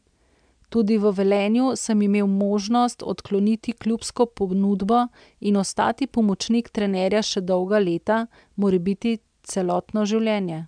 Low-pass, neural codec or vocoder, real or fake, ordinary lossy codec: 9.9 kHz; none; real; MP3, 96 kbps